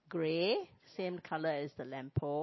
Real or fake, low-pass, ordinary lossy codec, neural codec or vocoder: real; 7.2 kHz; MP3, 24 kbps; none